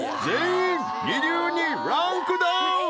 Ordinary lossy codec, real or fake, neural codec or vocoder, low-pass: none; real; none; none